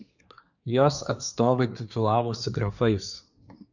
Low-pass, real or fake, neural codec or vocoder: 7.2 kHz; fake; codec, 24 kHz, 1 kbps, SNAC